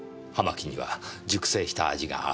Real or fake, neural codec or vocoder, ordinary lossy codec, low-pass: real; none; none; none